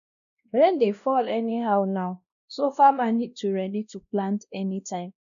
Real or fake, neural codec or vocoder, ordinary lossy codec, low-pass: fake; codec, 16 kHz, 1 kbps, X-Codec, WavLM features, trained on Multilingual LibriSpeech; none; 7.2 kHz